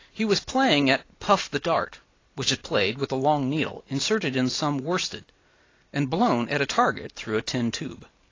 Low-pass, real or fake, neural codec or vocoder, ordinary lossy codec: 7.2 kHz; real; none; AAC, 32 kbps